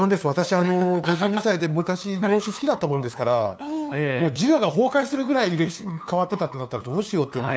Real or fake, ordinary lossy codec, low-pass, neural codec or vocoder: fake; none; none; codec, 16 kHz, 2 kbps, FunCodec, trained on LibriTTS, 25 frames a second